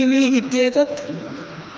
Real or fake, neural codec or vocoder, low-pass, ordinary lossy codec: fake; codec, 16 kHz, 2 kbps, FreqCodec, smaller model; none; none